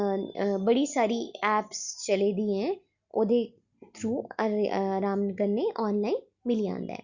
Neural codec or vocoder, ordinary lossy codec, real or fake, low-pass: none; Opus, 64 kbps; real; 7.2 kHz